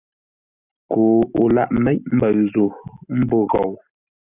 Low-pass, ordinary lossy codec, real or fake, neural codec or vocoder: 3.6 kHz; Opus, 64 kbps; real; none